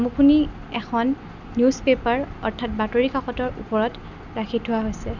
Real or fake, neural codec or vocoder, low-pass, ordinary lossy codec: real; none; 7.2 kHz; none